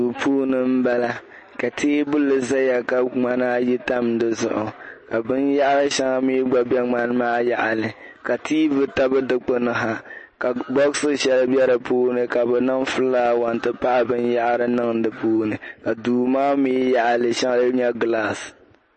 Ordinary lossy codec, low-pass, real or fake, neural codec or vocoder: MP3, 32 kbps; 10.8 kHz; real; none